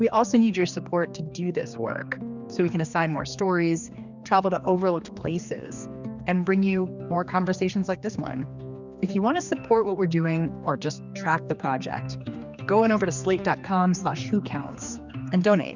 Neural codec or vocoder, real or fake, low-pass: codec, 16 kHz, 2 kbps, X-Codec, HuBERT features, trained on general audio; fake; 7.2 kHz